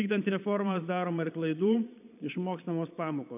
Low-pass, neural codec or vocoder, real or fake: 3.6 kHz; vocoder, 22.05 kHz, 80 mel bands, Vocos; fake